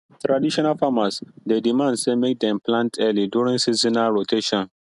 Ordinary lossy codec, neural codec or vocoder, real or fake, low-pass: none; none; real; 10.8 kHz